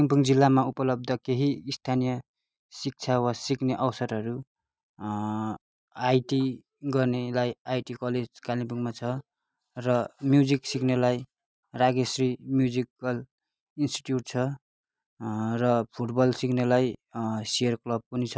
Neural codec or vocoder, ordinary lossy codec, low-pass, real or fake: none; none; none; real